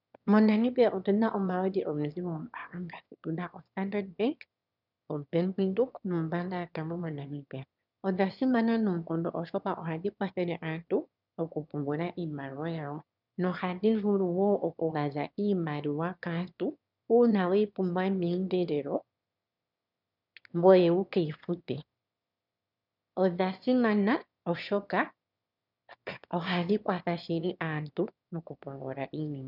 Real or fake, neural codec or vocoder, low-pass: fake; autoencoder, 22.05 kHz, a latent of 192 numbers a frame, VITS, trained on one speaker; 5.4 kHz